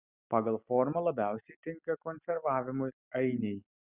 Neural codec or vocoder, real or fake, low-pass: none; real; 3.6 kHz